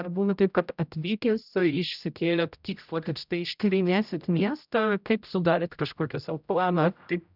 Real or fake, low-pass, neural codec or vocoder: fake; 5.4 kHz; codec, 16 kHz, 0.5 kbps, X-Codec, HuBERT features, trained on general audio